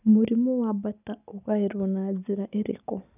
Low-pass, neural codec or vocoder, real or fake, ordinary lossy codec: 3.6 kHz; none; real; none